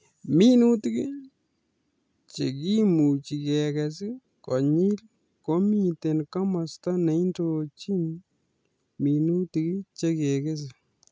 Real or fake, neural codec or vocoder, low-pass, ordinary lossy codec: real; none; none; none